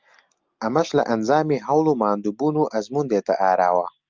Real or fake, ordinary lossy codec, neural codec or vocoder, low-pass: real; Opus, 24 kbps; none; 7.2 kHz